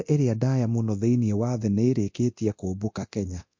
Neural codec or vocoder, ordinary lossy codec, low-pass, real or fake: codec, 24 kHz, 0.9 kbps, DualCodec; MP3, 48 kbps; 7.2 kHz; fake